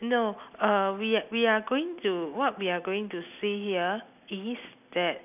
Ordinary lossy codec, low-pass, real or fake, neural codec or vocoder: none; 3.6 kHz; real; none